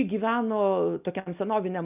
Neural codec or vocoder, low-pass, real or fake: none; 3.6 kHz; real